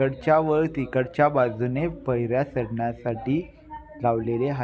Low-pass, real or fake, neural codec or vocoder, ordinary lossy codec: none; real; none; none